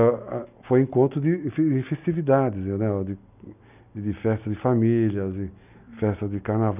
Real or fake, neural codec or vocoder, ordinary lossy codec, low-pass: real; none; none; 3.6 kHz